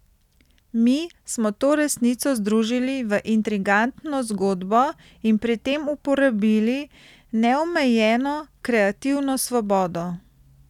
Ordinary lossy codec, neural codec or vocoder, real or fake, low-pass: none; none; real; 19.8 kHz